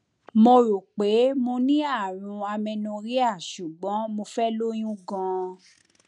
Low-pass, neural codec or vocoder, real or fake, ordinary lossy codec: 10.8 kHz; none; real; none